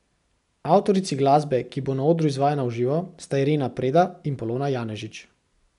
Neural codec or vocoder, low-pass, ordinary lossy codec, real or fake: none; 10.8 kHz; none; real